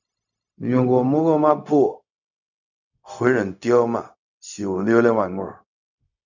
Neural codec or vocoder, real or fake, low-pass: codec, 16 kHz, 0.4 kbps, LongCat-Audio-Codec; fake; 7.2 kHz